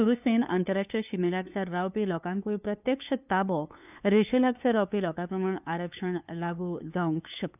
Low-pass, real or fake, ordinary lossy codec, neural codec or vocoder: 3.6 kHz; fake; none; codec, 16 kHz, 2 kbps, FunCodec, trained on LibriTTS, 25 frames a second